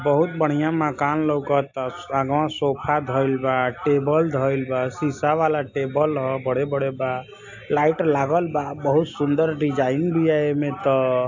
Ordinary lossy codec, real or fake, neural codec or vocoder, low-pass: AAC, 48 kbps; real; none; 7.2 kHz